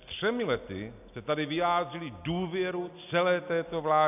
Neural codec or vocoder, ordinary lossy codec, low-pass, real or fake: none; AAC, 32 kbps; 3.6 kHz; real